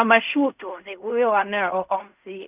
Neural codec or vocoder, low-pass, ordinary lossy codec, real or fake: codec, 16 kHz in and 24 kHz out, 0.4 kbps, LongCat-Audio-Codec, fine tuned four codebook decoder; 3.6 kHz; none; fake